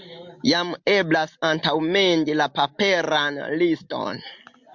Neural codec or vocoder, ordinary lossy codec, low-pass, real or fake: none; MP3, 64 kbps; 7.2 kHz; real